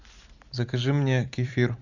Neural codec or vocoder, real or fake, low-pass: vocoder, 44.1 kHz, 128 mel bands every 512 samples, BigVGAN v2; fake; 7.2 kHz